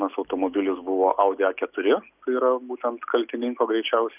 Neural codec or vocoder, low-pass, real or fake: none; 3.6 kHz; real